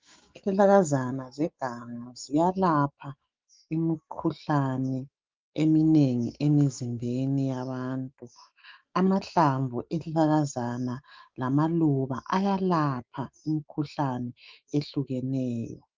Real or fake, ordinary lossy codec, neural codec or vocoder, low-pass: fake; Opus, 32 kbps; autoencoder, 48 kHz, 128 numbers a frame, DAC-VAE, trained on Japanese speech; 7.2 kHz